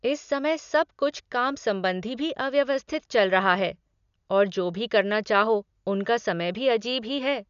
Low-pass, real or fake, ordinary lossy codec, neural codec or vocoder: 7.2 kHz; real; none; none